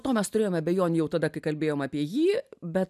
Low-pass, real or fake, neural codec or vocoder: 14.4 kHz; real; none